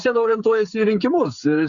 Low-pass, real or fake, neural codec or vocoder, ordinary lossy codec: 7.2 kHz; fake; codec, 16 kHz, 16 kbps, FreqCodec, smaller model; Opus, 64 kbps